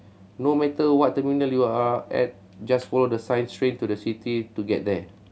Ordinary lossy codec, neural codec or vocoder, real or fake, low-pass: none; none; real; none